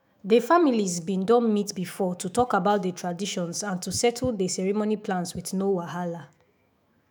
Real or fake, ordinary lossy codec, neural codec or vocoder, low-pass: fake; none; autoencoder, 48 kHz, 128 numbers a frame, DAC-VAE, trained on Japanese speech; none